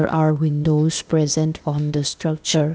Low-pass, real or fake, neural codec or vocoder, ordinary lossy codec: none; fake; codec, 16 kHz, 0.8 kbps, ZipCodec; none